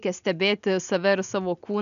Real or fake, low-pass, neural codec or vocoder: real; 7.2 kHz; none